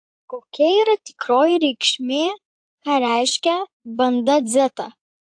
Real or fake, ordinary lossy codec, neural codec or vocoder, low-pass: fake; AAC, 64 kbps; codec, 16 kHz in and 24 kHz out, 2.2 kbps, FireRedTTS-2 codec; 9.9 kHz